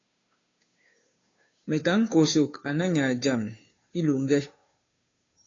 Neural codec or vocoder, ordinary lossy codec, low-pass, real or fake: codec, 16 kHz, 2 kbps, FunCodec, trained on Chinese and English, 25 frames a second; AAC, 32 kbps; 7.2 kHz; fake